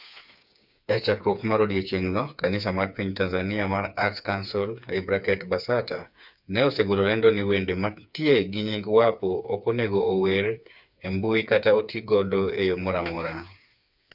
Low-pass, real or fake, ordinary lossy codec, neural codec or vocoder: 5.4 kHz; fake; none; codec, 16 kHz, 4 kbps, FreqCodec, smaller model